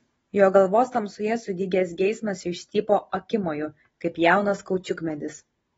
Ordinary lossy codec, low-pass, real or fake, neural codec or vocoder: AAC, 24 kbps; 19.8 kHz; real; none